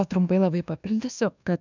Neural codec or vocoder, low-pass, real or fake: codec, 24 kHz, 1.2 kbps, DualCodec; 7.2 kHz; fake